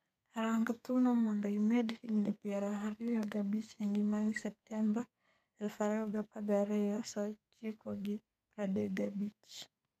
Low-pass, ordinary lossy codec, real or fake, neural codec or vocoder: 14.4 kHz; none; fake; codec, 32 kHz, 1.9 kbps, SNAC